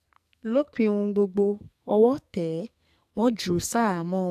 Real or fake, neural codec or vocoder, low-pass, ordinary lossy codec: fake; codec, 44.1 kHz, 2.6 kbps, SNAC; 14.4 kHz; AAC, 96 kbps